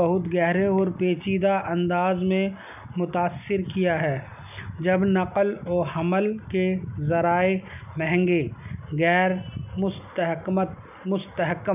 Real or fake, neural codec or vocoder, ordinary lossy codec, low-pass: real; none; none; 3.6 kHz